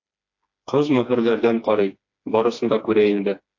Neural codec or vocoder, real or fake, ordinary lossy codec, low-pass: codec, 16 kHz, 2 kbps, FreqCodec, smaller model; fake; AAC, 48 kbps; 7.2 kHz